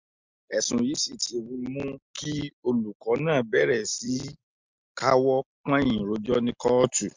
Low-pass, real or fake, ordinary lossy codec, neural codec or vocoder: 7.2 kHz; real; MP3, 64 kbps; none